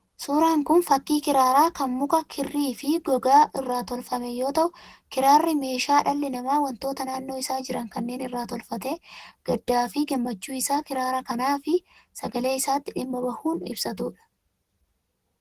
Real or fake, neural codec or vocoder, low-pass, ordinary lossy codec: real; none; 14.4 kHz; Opus, 16 kbps